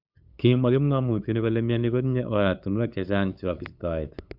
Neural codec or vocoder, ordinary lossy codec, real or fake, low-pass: codec, 16 kHz, 8 kbps, FunCodec, trained on LibriTTS, 25 frames a second; Opus, 64 kbps; fake; 5.4 kHz